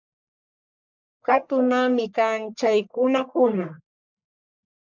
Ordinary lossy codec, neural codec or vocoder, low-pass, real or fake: MP3, 64 kbps; codec, 44.1 kHz, 1.7 kbps, Pupu-Codec; 7.2 kHz; fake